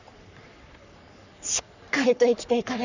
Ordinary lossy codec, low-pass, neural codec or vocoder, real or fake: none; 7.2 kHz; codec, 44.1 kHz, 3.4 kbps, Pupu-Codec; fake